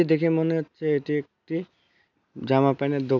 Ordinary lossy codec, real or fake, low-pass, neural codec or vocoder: none; real; 7.2 kHz; none